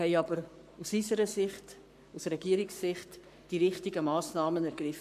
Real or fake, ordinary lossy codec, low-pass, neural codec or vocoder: fake; AAC, 96 kbps; 14.4 kHz; codec, 44.1 kHz, 7.8 kbps, Pupu-Codec